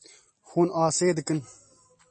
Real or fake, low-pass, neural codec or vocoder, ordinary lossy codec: real; 9.9 kHz; none; MP3, 32 kbps